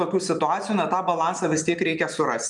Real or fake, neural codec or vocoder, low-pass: real; none; 10.8 kHz